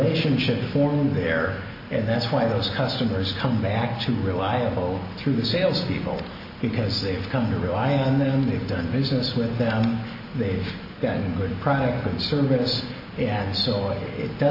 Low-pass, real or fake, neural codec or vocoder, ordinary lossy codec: 5.4 kHz; real; none; AAC, 48 kbps